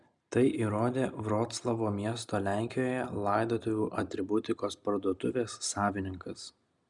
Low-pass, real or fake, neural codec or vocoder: 10.8 kHz; real; none